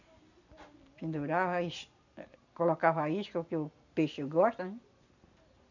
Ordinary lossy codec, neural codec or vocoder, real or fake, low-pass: none; none; real; 7.2 kHz